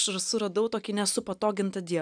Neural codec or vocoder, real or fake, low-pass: none; real; 9.9 kHz